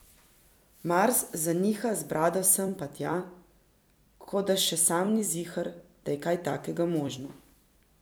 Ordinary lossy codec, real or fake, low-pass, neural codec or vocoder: none; fake; none; vocoder, 44.1 kHz, 128 mel bands every 256 samples, BigVGAN v2